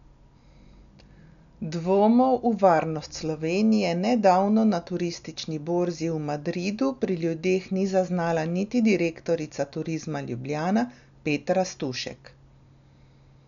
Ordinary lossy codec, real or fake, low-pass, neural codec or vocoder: none; real; 7.2 kHz; none